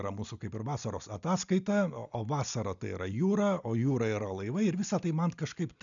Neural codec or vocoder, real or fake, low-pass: none; real; 7.2 kHz